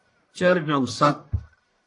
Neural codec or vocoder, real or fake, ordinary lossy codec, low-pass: codec, 44.1 kHz, 1.7 kbps, Pupu-Codec; fake; AAC, 48 kbps; 10.8 kHz